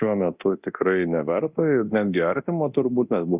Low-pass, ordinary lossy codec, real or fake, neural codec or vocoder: 3.6 kHz; Opus, 64 kbps; fake; codec, 24 kHz, 0.9 kbps, DualCodec